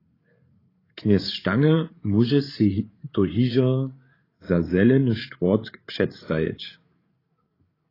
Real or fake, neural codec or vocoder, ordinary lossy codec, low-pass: fake; codec, 16 kHz, 8 kbps, FreqCodec, larger model; AAC, 24 kbps; 5.4 kHz